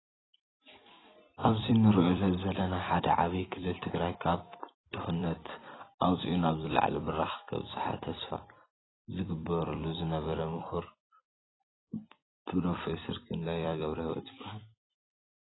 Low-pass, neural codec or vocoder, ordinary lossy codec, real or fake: 7.2 kHz; vocoder, 44.1 kHz, 128 mel bands every 256 samples, BigVGAN v2; AAC, 16 kbps; fake